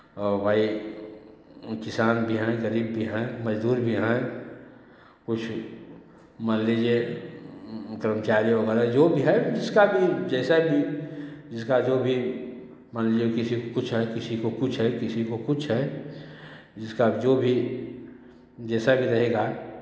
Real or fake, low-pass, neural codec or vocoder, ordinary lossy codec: real; none; none; none